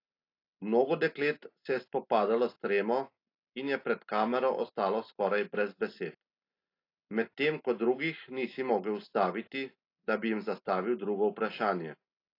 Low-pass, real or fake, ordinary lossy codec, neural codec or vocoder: 5.4 kHz; real; AAC, 32 kbps; none